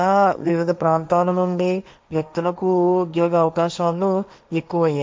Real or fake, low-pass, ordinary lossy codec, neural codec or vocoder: fake; none; none; codec, 16 kHz, 1.1 kbps, Voila-Tokenizer